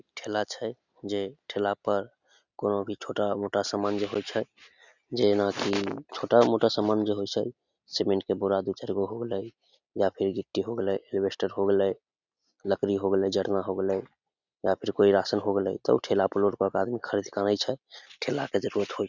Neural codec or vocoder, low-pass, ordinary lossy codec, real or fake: none; 7.2 kHz; none; real